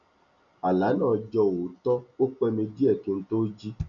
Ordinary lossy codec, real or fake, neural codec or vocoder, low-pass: none; real; none; 7.2 kHz